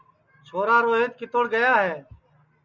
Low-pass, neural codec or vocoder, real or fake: 7.2 kHz; none; real